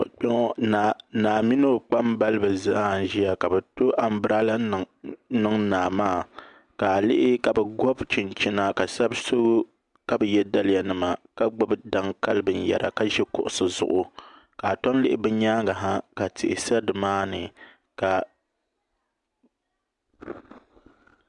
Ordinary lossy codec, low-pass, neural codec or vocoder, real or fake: MP3, 96 kbps; 10.8 kHz; none; real